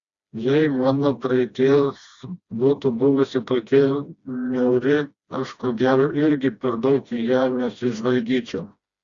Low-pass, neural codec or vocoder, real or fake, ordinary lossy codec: 7.2 kHz; codec, 16 kHz, 1 kbps, FreqCodec, smaller model; fake; Opus, 64 kbps